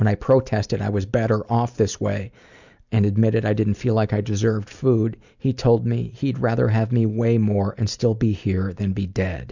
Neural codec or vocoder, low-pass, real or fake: none; 7.2 kHz; real